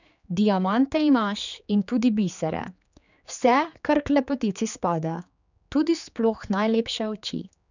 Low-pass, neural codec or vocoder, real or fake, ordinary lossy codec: 7.2 kHz; codec, 16 kHz, 4 kbps, X-Codec, HuBERT features, trained on general audio; fake; none